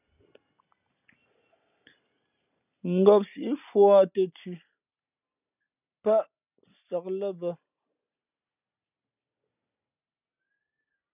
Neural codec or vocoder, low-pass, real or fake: none; 3.6 kHz; real